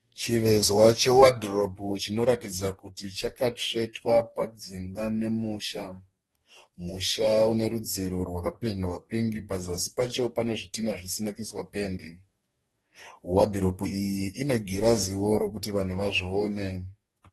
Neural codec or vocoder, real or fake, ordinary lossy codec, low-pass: codec, 44.1 kHz, 2.6 kbps, DAC; fake; AAC, 32 kbps; 19.8 kHz